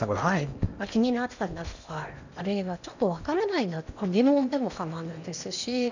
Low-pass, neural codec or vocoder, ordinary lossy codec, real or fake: 7.2 kHz; codec, 16 kHz in and 24 kHz out, 0.8 kbps, FocalCodec, streaming, 65536 codes; none; fake